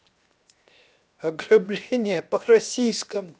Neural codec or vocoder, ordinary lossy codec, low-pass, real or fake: codec, 16 kHz, 0.7 kbps, FocalCodec; none; none; fake